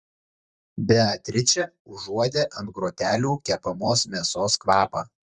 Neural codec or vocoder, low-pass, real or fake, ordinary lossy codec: vocoder, 44.1 kHz, 128 mel bands, Pupu-Vocoder; 10.8 kHz; fake; Opus, 64 kbps